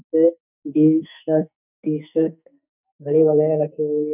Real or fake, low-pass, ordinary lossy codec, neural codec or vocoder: fake; 3.6 kHz; none; codec, 44.1 kHz, 2.6 kbps, SNAC